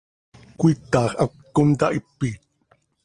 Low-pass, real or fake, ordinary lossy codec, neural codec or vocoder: 9.9 kHz; real; Opus, 24 kbps; none